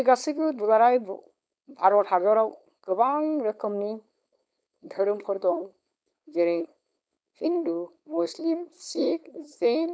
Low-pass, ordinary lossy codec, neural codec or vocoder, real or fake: none; none; codec, 16 kHz, 4.8 kbps, FACodec; fake